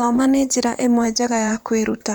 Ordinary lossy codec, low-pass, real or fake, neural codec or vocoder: none; none; fake; vocoder, 44.1 kHz, 128 mel bands, Pupu-Vocoder